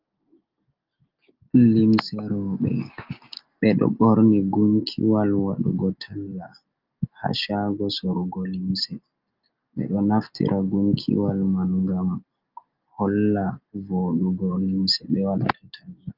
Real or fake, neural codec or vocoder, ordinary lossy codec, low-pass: real; none; Opus, 32 kbps; 5.4 kHz